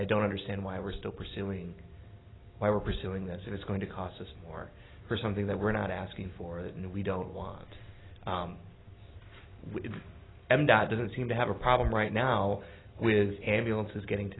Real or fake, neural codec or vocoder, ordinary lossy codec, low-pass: real; none; AAC, 16 kbps; 7.2 kHz